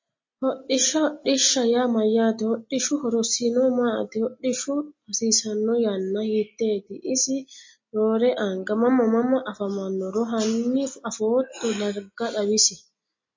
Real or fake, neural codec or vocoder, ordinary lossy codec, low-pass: real; none; MP3, 32 kbps; 7.2 kHz